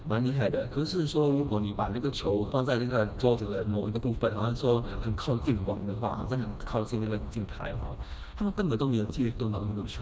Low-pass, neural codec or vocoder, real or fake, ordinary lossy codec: none; codec, 16 kHz, 1 kbps, FreqCodec, smaller model; fake; none